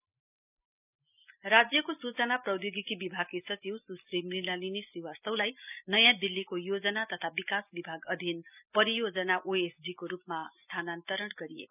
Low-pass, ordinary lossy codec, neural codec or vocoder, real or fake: 3.6 kHz; none; none; real